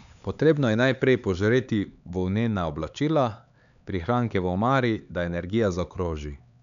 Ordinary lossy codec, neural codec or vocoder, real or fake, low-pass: none; codec, 16 kHz, 4 kbps, X-Codec, HuBERT features, trained on LibriSpeech; fake; 7.2 kHz